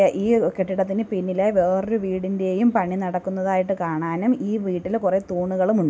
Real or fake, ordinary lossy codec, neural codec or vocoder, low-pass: real; none; none; none